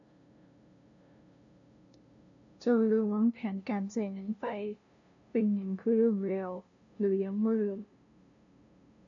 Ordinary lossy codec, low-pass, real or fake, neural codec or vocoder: none; 7.2 kHz; fake; codec, 16 kHz, 0.5 kbps, FunCodec, trained on LibriTTS, 25 frames a second